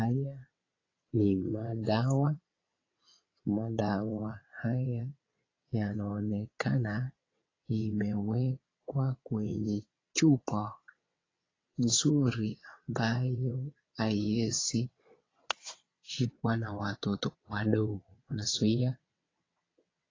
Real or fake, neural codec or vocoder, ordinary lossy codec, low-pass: fake; vocoder, 22.05 kHz, 80 mel bands, WaveNeXt; AAC, 32 kbps; 7.2 kHz